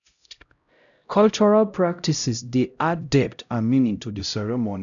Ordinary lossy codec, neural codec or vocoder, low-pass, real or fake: none; codec, 16 kHz, 0.5 kbps, X-Codec, HuBERT features, trained on LibriSpeech; 7.2 kHz; fake